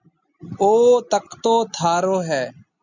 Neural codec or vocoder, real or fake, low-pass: none; real; 7.2 kHz